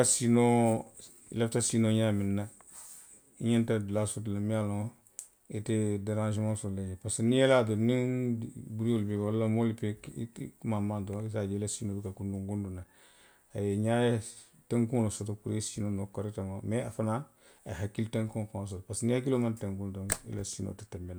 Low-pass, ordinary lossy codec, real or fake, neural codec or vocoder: none; none; real; none